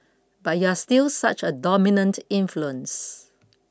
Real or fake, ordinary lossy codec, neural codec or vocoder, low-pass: real; none; none; none